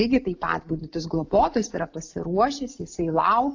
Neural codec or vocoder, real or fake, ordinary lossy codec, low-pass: none; real; AAC, 48 kbps; 7.2 kHz